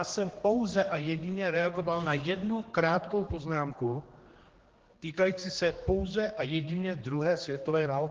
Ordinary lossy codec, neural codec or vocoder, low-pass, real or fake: Opus, 16 kbps; codec, 16 kHz, 2 kbps, X-Codec, HuBERT features, trained on general audio; 7.2 kHz; fake